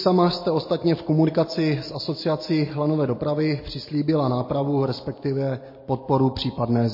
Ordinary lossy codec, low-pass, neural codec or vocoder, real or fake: MP3, 24 kbps; 5.4 kHz; none; real